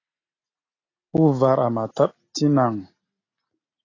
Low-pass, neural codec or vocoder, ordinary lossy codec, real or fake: 7.2 kHz; none; AAC, 32 kbps; real